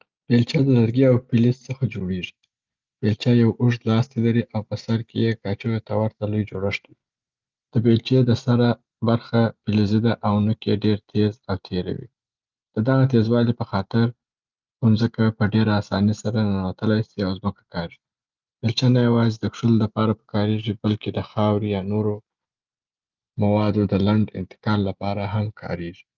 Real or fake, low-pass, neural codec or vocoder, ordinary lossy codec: real; 7.2 kHz; none; Opus, 24 kbps